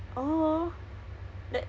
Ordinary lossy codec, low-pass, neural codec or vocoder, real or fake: none; none; none; real